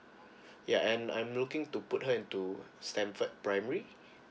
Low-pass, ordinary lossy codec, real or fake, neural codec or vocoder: none; none; real; none